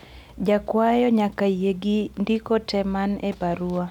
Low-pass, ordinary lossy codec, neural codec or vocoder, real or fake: 19.8 kHz; none; none; real